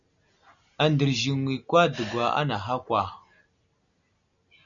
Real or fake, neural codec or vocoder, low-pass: real; none; 7.2 kHz